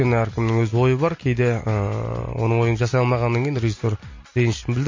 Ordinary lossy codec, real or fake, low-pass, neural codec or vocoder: MP3, 32 kbps; real; 7.2 kHz; none